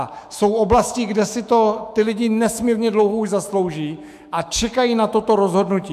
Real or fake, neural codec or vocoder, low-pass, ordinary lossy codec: fake; autoencoder, 48 kHz, 128 numbers a frame, DAC-VAE, trained on Japanese speech; 14.4 kHz; MP3, 96 kbps